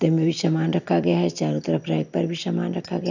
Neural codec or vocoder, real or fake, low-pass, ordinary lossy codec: none; real; 7.2 kHz; none